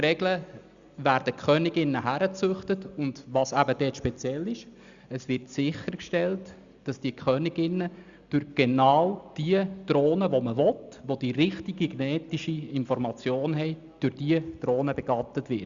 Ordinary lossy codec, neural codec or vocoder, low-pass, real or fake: Opus, 64 kbps; none; 7.2 kHz; real